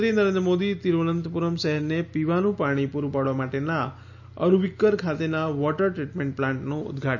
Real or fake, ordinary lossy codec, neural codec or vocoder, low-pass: real; none; none; 7.2 kHz